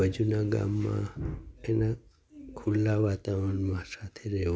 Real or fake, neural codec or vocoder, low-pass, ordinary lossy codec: real; none; none; none